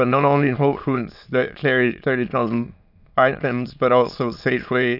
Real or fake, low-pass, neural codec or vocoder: fake; 5.4 kHz; autoencoder, 22.05 kHz, a latent of 192 numbers a frame, VITS, trained on many speakers